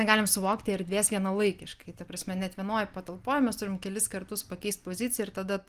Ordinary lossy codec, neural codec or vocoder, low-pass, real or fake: Opus, 24 kbps; none; 14.4 kHz; real